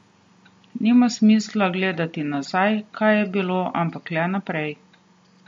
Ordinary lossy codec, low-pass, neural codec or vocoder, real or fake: MP3, 48 kbps; 19.8 kHz; none; real